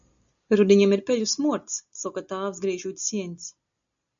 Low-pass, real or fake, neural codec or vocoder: 7.2 kHz; real; none